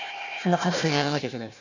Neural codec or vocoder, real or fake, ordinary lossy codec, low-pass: codec, 16 kHz, 1 kbps, FunCodec, trained on Chinese and English, 50 frames a second; fake; none; 7.2 kHz